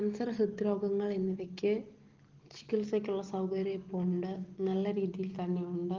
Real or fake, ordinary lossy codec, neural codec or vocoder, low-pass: real; Opus, 16 kbps; none; 7.2 kHz